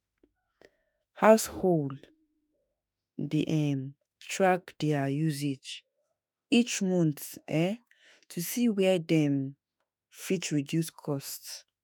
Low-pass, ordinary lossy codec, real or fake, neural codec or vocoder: none; none; fake; autoencoder, 48 kHz, 32 numbers a frame, DAC-VAE, trained on Japanese speech